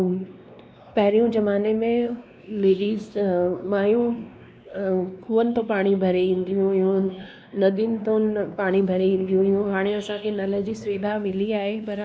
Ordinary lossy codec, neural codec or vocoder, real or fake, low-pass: none; codec, 16 kHz, 2 kbps, X-Codec, WavLM features, trained on Multilingual LibriSpeech; fake; none